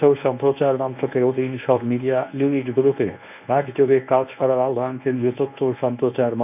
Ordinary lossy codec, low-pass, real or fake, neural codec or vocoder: none; 3.6 kHz; fake; codec, 24 kHz, 0.9 kbps, WavTokenizer, medium speech release version 1